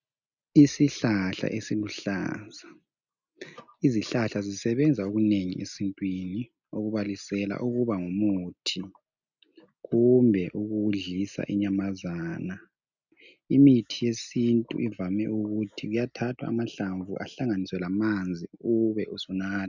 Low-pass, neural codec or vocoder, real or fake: 7.2 kHz; none; real